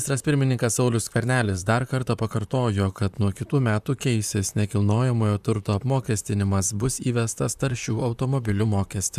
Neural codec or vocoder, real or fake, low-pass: vocoder, 44.1 kHz, 128 mel bands, Pupu-Vocoder; fake; 14.4 kHz